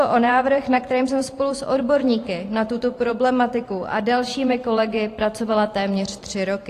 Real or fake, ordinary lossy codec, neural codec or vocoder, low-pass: fake; AAC, 48 kbps; vocoder, 44.1 kHz, 128 mel bands every 512 samples, BigVGAN v2; 14.4 kHz